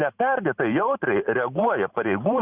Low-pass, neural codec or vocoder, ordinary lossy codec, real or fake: 3.6 kHz; vocoder, 44.1 kHz, 128 mel bands every 512 samples, BigVGAN v2; Opus, 64 kbps; fake